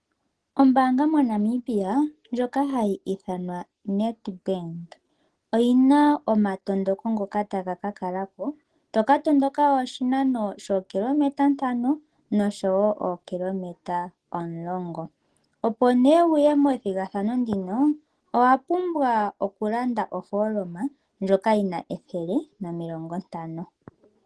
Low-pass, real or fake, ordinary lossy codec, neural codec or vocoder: 10.8 kHz; real; Opus, 16 kbps; none